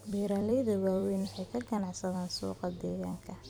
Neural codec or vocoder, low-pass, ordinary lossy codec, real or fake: vocoder, 44.1 kHz, 128 mel bands every 256 samples, BigVGAN v2; none; none; fake